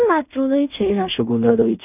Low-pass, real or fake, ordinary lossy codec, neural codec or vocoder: 3.6 kHz; fake; none; codec, 16 kHz in and 24 kHz out, 0.4 kbps, LongCat-Audio-Codec, two codebook decoder